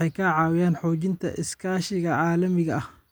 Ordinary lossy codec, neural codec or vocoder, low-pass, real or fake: none; none; none; real